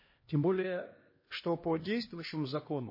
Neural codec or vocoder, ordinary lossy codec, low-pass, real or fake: codec, 16 kHz, 1 kbps, X-Codec, HuBERT features, trained on LibriSpeech; MP3, 24 kbps; 5.4 kHz; fake